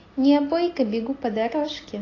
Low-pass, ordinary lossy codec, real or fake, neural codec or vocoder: 7.2 kHz; AAC, 32 kbps; real; none